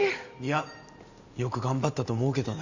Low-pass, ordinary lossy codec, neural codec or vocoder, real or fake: 7.2 kHz; none; vocoder, 44.1 kHz, 128 mel bands every 512 samples, BigVGAN v2; fake